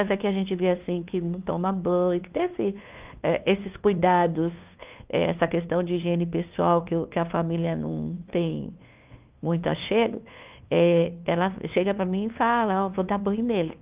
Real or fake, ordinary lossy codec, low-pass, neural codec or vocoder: fake; Opus, 24 kbps; 3.6 kHz; codec, 16 kHz, 2 kbps, FunCodec, trained on LibriTTS, 25 frames a second